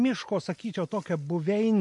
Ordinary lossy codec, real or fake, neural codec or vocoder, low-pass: MP3, 48 kbps; real; none; 10.8 kHz